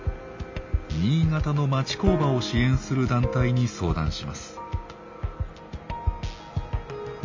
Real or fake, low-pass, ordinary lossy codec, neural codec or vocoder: real; 7.2 kHz; MP3, 48 kbps; none